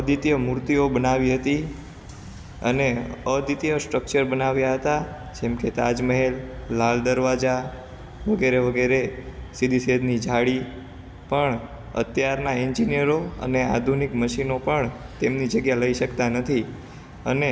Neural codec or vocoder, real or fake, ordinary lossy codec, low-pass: none; real; none; none